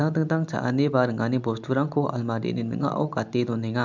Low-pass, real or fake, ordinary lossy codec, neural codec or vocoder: 7.2 kHz; real; MP3, 64 kbps; none